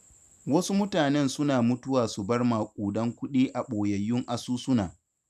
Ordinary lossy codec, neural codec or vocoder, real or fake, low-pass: none; vocoder, 44.1 kHz, 128 mel bands every 256 samples, BigVGAN v2; fake; 14.4 kHz